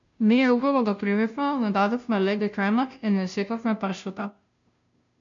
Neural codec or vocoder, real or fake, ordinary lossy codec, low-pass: codec, 16 kHz, 0.5 kbps, FunCodec, trained on Chinese and English, 25 frames a second; fake; MP3, 64 kbps; 7.2 kHz